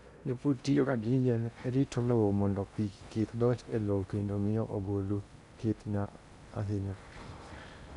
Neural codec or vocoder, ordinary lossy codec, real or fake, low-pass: codec, 16 kHz in and 24 kHz out, 0.6 kbps, FocalCodec, streaming, 2048 codes; none; fake; 10.8 kHz